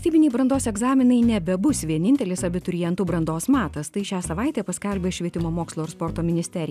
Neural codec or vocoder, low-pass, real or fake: none; 14.4 kHz; real